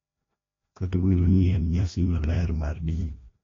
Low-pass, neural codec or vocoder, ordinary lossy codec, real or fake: 7.2 kHz; codec, 16 kHz, 1 kbps, FreqCodec, larger model; AAC, 32 kbps; fake